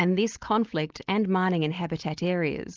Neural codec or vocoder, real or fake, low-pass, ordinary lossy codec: none; real; 7.2 kHz; Opus, 32 kbps